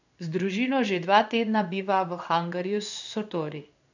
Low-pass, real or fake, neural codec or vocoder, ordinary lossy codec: 7.2 kHz; fake; codec, 16 kHz in and 24 kHz out, 1 kbps, XY-Tokenizer; none